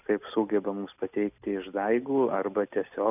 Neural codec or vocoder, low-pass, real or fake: none; 3.6 kHz; real